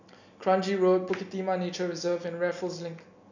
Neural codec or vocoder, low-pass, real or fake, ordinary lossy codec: none; 7.2 kHz; real; none